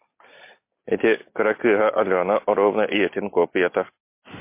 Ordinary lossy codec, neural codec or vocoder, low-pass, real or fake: MP3, 24 kbps; none; 3.6 kHz; real